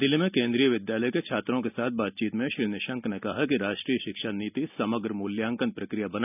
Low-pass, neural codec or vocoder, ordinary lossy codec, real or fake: 3.6 kHz; none; none; real